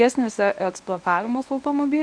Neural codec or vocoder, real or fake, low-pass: codec, 24 kHz, 0.9 kbps, WavTokenizer, medium speech release version 1; fake; 9.9 kHz